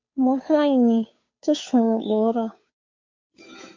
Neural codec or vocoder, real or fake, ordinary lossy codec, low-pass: codec, 16 kHz, 2 kbps, FunCodec, trained on Chinese and English, 25 frames a second; fake; MP3, 48 kbps; 7.2 kHz